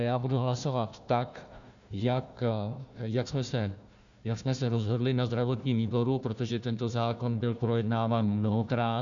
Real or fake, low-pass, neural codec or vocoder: fake; 7.2 kHz; codec, 16 kHz, 1 kbps, FunCodec, trained on Chinese and English, 50 frames a second